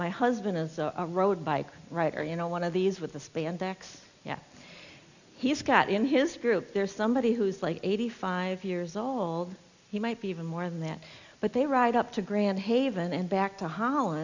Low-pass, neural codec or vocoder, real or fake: 7.2 kHz; none; real